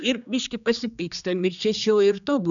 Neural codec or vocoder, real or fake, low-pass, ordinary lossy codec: codec, 16 kHz, 2 kbps, X-Codec, HuBERT features, trained on general audio; fake; 7.2 kHz; MP3, 96 kbps